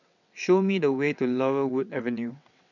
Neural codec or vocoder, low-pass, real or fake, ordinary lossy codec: vocoder, 22.05 kHz, 80 mel bands, WaveNeXt; 7.2 kHz; fake; none